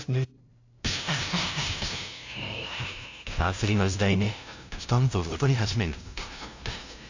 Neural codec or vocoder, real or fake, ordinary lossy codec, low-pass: codec, 16 kHz, 0.5 kbps, FunCodec, trained on LibriTTS, 25 frames a second; fake; AAC, 48 kbps; 7.2 kHz